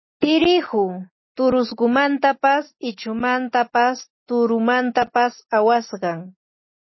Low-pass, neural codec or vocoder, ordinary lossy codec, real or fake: 7.2 kHz; none; MP3, 24 kbps; real